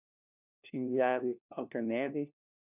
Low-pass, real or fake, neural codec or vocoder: 3.6 kHz; fake; codec, 16 kHz, 1 kbps, FunCodec, trained on LibriTTS, 50 frames a second